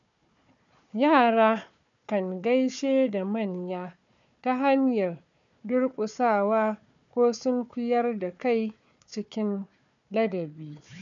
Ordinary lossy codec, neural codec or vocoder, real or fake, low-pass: none; codec, 16 kHz, 4 kbps, FunCodec, trained on Chinese and English, 50 frames a second; fake; 7.2 kHz